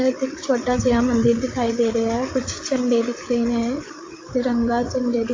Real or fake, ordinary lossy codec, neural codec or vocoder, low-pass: fake; AAC, 32 kbps; codec, 16 kHz, 8 kbps, FreqCodec, larger model; 7.2 kHz